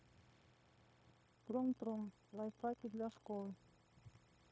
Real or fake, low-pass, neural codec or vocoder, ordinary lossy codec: fake; none; codec, 16 kHz, 0.9 kbps, LongCat-Audio-Codec; none